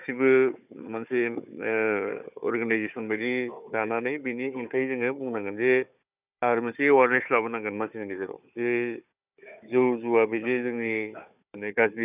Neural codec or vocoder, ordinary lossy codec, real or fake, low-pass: codec, 16 kHz, 4 kbps, FunCodec, trained on Chinese and English, 50 frames a second; none; fake; 3.6 kHz